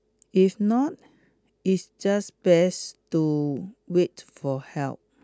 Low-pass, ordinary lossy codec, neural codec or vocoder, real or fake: none; none; none; real